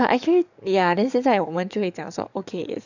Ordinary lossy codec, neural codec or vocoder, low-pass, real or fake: none; codec, 44.1 kHz, 7.8 kbps, DAC; 7.2 kHz; fake